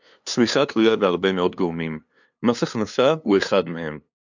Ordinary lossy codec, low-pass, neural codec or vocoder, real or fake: MP3, 64 kbps; 7.2 kHz; codec, 16 kHz, 2 kbps, FunCodec, trained on LibriTTS, 25 frames a second; fake